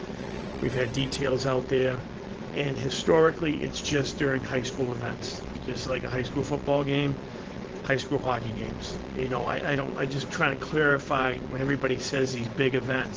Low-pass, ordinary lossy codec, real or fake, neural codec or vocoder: 7.2 kHz; Opus, 16 kbps; fake; vocoder, 22.05 kHz, 80 mel bands, WaveNeXt